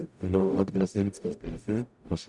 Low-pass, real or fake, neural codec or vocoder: 10.8 kHz; fake; codec, 44.1 kHz, 0.9 kbps, DAC